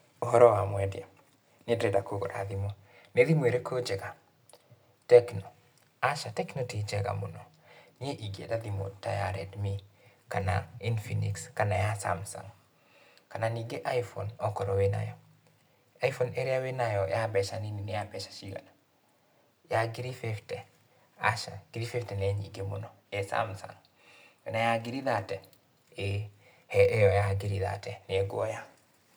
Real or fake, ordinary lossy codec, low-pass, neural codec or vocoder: real; none; none; none